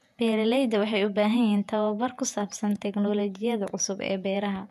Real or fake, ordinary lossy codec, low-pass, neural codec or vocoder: fake; MP3, 96 kbps; 14.4 kHz; vocoder, 48 kHz, 128 mel bands, Vocos